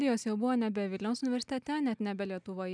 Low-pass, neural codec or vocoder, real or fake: 9.9 kHz; none; real